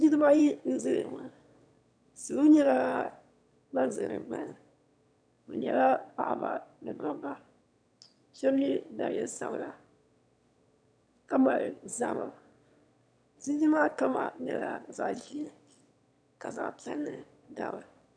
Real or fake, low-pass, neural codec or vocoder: fake; 9.9 kHz; autoencoder, 22.05 kHz, a latent of 192 numbers a frame, VITS, trained on one speaker